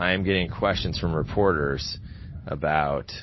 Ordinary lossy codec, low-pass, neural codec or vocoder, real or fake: MP3, 24 kbps; 7.2 kHz; none; real